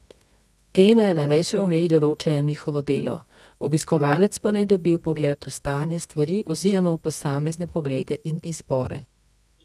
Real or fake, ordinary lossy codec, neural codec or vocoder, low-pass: fake; none; codec, 24 kHz, 0.9 kbps, WavTokenizer, medium music audio release; none